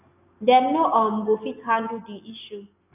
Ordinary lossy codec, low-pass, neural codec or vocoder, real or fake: none; 3.6 kHz; none; real